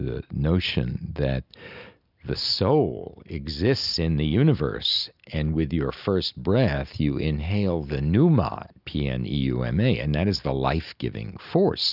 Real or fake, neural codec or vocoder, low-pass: real; none; 5.4 kHz